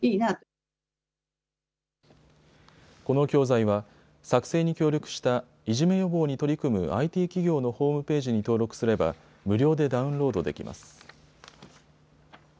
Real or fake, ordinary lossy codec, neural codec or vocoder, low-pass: real; none; none; none